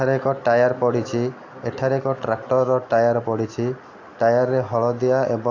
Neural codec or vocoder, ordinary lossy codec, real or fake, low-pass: none; AAC, 48 kbps; real; 7.2 kHz